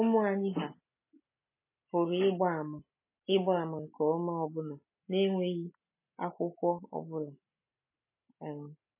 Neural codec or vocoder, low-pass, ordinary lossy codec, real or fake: none; 3.6 kHz; MP3, 16 kbps; real